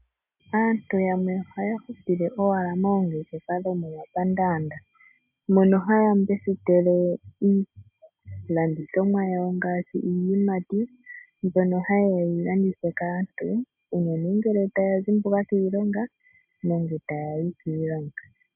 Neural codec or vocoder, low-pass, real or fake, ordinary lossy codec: none; 3.6 kHz; real; MP3, 32 kbps